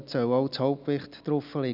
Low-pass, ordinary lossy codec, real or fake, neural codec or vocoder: 5.4 kHz; none; real; none